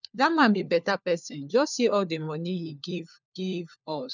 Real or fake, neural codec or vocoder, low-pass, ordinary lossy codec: fake; codec, 16 kHz, 4 kbps, FunCodec, trained on LibriTTS, 50 frames a second; 7.2 kHz; none